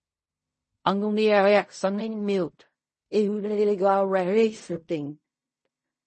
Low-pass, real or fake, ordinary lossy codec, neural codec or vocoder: 10.8 kHz; fake; MP3, 32 kbps; codec, 16 kHz in and 24 kHz out, 0.4 kbps, LongCat-Audio-Codec, fine tuned four codebook decoder